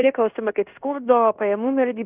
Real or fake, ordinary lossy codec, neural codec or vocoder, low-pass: fake; Opus, 64 kbps; codec, 16 kHz in and 24 kHz out, 0.9 kbps, LongCat-Audio-Codec, fine tuned four codebook decoder; 3.6 kHz